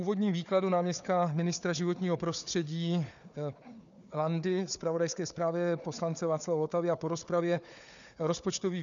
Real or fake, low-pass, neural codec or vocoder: fake; 7.2 kHz; codec, 16 kHz, 4 kbps, FunCodec, trained on Chinese and English, 50 frames a second